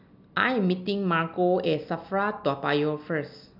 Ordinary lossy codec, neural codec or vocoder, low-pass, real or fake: none; none; 5.4 kHz; real